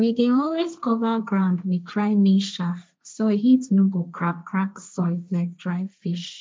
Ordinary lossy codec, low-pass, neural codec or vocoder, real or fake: none; none; codec, 16 kHz, 1.1 kbps, Voila-Tokenizer; fake